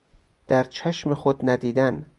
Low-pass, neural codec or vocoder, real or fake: 10.8 kHz; vocoder, 24 kHz, 100 mel bands, Vocos; fake